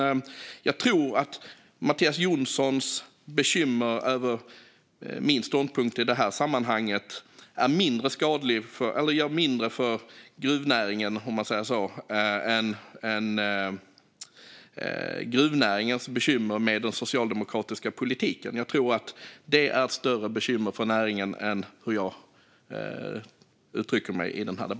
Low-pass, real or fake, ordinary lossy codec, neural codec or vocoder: none; real; none; none